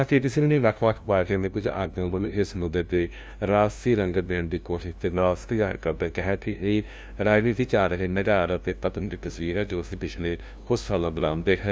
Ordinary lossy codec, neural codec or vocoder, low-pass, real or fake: none; codec, 16 kHz, 0.5 kbps, FunCodec, trained on LibriTTS, 25 frames a second; none; fake